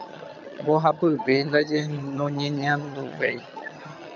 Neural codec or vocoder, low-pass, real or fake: vocoder, 22.05 kHz, 80 mel bands, HiFi-GAN; 7.2 kHz; fake